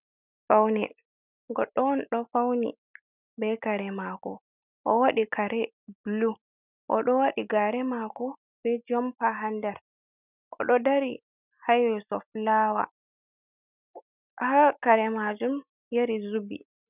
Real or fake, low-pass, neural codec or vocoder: real; 3.6 kHz; none